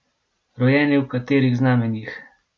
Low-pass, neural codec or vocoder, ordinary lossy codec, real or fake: none; none; none; real